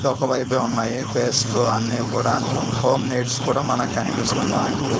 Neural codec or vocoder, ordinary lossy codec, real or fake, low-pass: codec, 16 kHz, 4.8 kbps, FACodec; none; fake; none